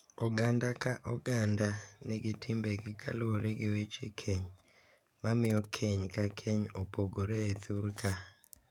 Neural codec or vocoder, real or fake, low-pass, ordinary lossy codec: codec, 44.1 kHz, 7.8 kbps, DAC; fake; 19.8 kHz; none